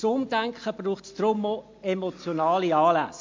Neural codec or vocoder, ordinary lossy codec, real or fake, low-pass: none; MP3, 48 kbps; real; 7.2 kHz